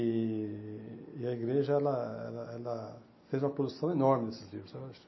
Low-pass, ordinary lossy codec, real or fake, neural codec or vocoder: 7.2 kHz; MP3, 24 kbps; real; none